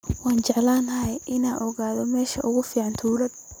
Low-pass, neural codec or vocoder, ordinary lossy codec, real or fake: none; none; none; real